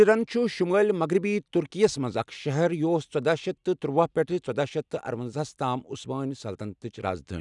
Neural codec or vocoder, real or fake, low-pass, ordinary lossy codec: none; real; 10.8 kHz; none